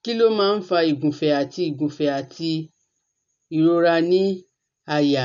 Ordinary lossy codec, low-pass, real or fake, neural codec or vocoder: none; 7.2 kHz; real; none